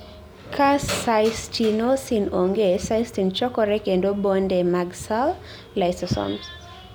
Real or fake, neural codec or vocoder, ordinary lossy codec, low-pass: real; none; none; none